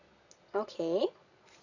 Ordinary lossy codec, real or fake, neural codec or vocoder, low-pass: none; fake; vocoder, 22.05 kHz, 80 mel bands, Vocos; 7.2 kHz